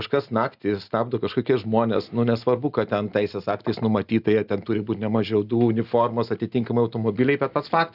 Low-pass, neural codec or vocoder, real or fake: 5.4 kHz; none; real